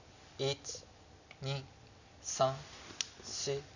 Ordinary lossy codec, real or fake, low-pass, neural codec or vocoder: none; real; 7.2 kHz; none